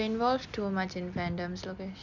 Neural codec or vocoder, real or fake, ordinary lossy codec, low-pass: none; real; none; 7.2 kHz